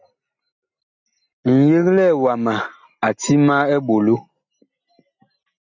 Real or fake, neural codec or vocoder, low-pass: real; none; 7.2 kHz